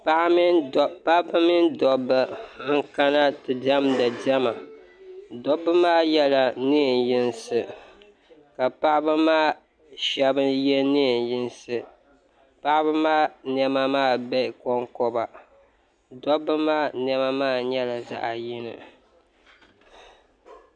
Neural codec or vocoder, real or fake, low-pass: none; real; 9.9 kHz